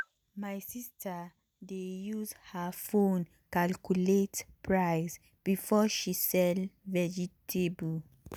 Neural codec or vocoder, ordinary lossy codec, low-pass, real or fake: none; none; none; real